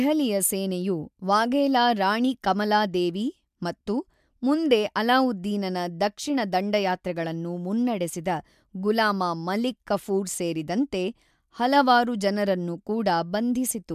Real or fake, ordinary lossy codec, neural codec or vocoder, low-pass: real; MP3, 96 kbps; none; 14.4 kHz